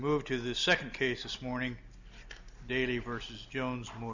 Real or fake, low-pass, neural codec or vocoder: real; 7.2 kHz; none